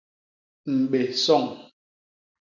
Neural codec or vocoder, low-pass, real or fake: none; 7.2 kHz; real